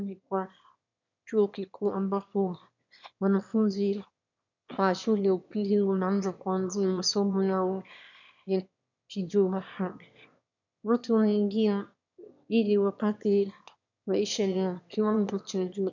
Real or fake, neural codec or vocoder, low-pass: fake; autoencoder, 22.05 kHz, a latent of 192 numbers a frame, VITS, trained on one speaker; 7.2 kHz